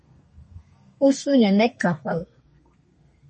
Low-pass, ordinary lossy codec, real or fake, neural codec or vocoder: 10.8 kHz; MP3, 32 kbps; fake; codec, 32 kHz, 1.9 kbps, SNAC